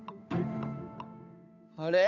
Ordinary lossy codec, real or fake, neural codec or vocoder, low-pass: none; fake; codec, 16 kHz, 8 kbps, FunCodec, trained on Chinese and English, 25 frames a second; 7.2 kHz